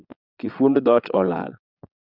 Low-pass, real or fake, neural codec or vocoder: 5.4 kHz; fake; vocoder, 22.05 kHz, 80 mel bands, Vocos